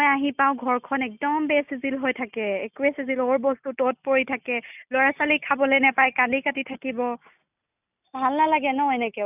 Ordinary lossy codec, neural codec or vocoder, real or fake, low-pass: none; none; real; 3.6 kHz